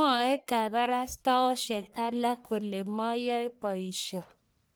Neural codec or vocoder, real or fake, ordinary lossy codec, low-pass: codec, 44.1 kHz, 1.7 kbps, Pupu-Codec; fake; none; none